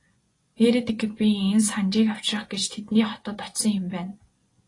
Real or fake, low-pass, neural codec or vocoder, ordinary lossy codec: fake; 10.8 kHz; vocoder, 24 kHz, 100 mel bands, Vocos; AAC, 32 kbps